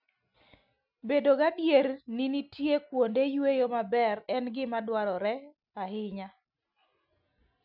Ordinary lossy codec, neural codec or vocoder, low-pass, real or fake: none; none; 5.4 kHz; real